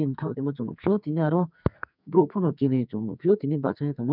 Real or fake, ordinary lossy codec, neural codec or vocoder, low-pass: fake; none; codec, 44.1 kHz, 2.6 kbps, SNAC; 5.4 kHz